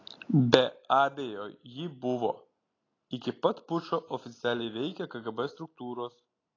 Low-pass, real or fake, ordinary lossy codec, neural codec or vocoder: 7.2 kHz; real; AAC, 32 kbps; none